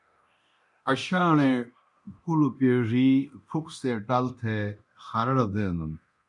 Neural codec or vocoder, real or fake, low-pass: codec, 24 kHz, 0.9 kbps, DualCodec; fake; 10.8 kHz